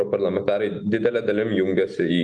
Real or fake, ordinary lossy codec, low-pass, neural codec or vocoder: real; Opus, 32 kbps; 10.8 kHz; none